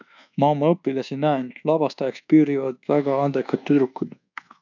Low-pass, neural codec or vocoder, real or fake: 7.2 kHz; codec, 24 kHz, 1.2 kbps, DualCodec; fake